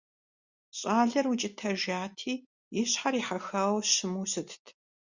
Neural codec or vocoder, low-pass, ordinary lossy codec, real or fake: none; 7.2 kHz; Opus, 64 kbps; real